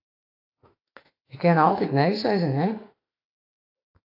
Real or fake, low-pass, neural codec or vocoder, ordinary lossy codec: fake; 5.4 kHz; autoencoder, 48 kHz, 32 numbers a frame, DAC-VAE, trained on Japanese speech; AAC, 32 kbps